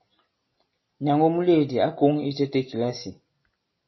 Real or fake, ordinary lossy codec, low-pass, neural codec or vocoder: real; MP3, 24 kbps; 7.2 kHz; none